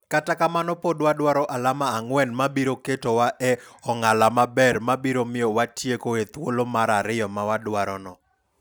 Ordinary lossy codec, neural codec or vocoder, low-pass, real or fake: none; none; none; real